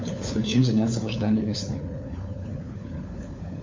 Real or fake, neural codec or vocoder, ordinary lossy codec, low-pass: fake; codec, 16 kHz, 4 kbps, FunCodec, trained on LibriTTS, 50 frames a second; MP3, 48 kbps; 7.2 kHz